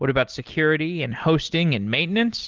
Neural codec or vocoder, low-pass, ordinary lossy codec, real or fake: none; 7.2 kHz; Opus, 16 kbps; real